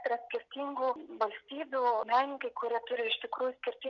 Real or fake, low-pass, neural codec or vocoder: real; 7.2 kHz; none